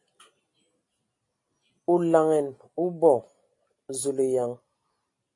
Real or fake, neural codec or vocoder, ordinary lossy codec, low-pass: real; none; AAC, 48 kbps; 10.8 kHz